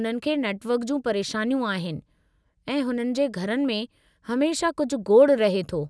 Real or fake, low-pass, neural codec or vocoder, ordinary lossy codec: real; none; none; none